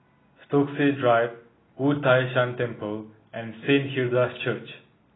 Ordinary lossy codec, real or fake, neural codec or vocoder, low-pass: AAC, 16 kbps; real; none; 7.2 kHz